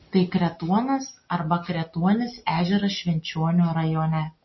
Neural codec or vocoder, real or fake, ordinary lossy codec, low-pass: none; real; MP3, 24 kbps; 7.2 kHz